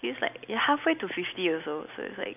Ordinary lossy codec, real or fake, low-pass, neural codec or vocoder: none; real; 3.6 kHz; none